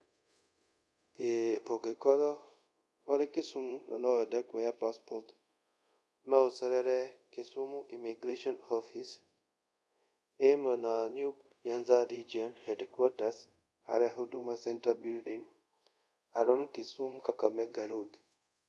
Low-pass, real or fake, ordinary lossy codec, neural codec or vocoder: none; fake; none; codec, 24 kHz, 0.5 kbps, DualCodec